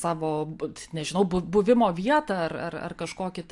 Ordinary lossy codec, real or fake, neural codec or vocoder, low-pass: AAC, 64 kbps; real; none; 10.8 kHz